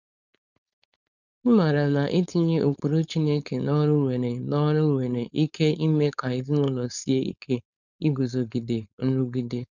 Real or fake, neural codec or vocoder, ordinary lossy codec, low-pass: fake; codec, 16 kHz, 4.8 kbps, FACodec; none; 7.2 kHz